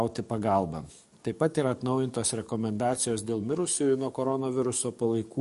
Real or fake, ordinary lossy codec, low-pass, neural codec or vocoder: fake; MP3, 48 kbps; 14.4 kHz; codec, 44.1 kHz, 7.8 kbps, DAC